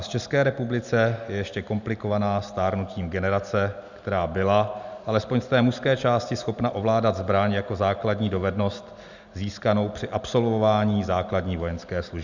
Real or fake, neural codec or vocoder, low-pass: real; none; 7.2 kHz